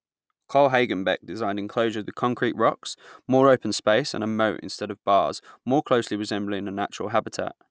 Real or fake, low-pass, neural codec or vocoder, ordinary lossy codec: real; none; none; none